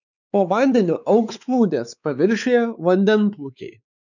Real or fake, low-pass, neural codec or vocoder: fake; 7.2 kHz; codec, 16 kHz, 4 kbps, X-Codec, WavLM features, trained on Multilingual LibriSpeech